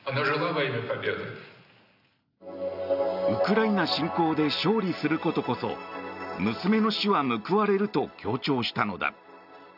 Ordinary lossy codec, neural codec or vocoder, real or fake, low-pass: none; none; real; 5.4 kHz